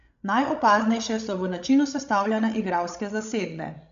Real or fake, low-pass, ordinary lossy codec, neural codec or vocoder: fake; 7.2 kHz; AAC, 64 kbps; codec, 16 kHz, 16 kbps, FreqCodec, larger model